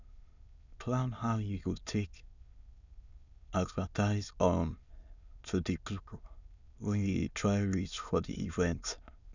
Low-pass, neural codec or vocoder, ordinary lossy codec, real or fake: 7.2 kHz; autoencoder, 22.05 kHz, a latent of 192 numbers a frame, VITS, trained on many speakers; none; fake